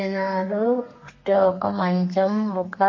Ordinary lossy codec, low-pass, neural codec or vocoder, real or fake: MP3, 32 kbps; 7.2 kHz; codec, 32 kHz, 1.9 kbps, SNAC; fake